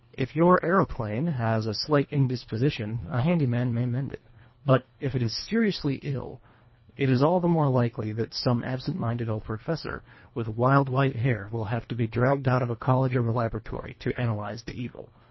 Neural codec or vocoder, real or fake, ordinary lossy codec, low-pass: codec, 24 kHz, 1.5 kbps, HILCodec; fake; MP3, 24 kbps; 7.2 kHz